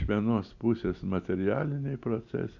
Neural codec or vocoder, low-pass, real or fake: none; 7.2 kHz; real